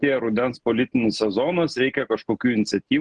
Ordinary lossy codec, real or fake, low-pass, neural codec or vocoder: Opus, 16 kbps; real; 9.9 kHz; none